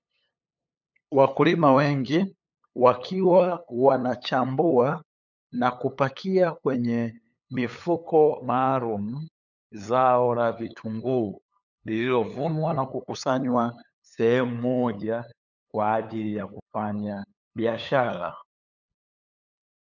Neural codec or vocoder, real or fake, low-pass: codec, 16 kHz, 8 kbps, FunCodec, trained on LibriTTS, 25 frames a second; fake; 7.2 kHz